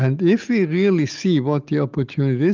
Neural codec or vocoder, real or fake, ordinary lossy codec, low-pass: none; real; Opus, 32 kbps; 7.2 kHz